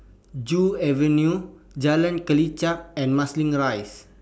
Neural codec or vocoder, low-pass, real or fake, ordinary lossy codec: none; none; real; none